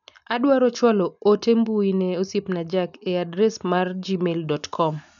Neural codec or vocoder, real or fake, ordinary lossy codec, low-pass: none; real; none; 7.2 kHz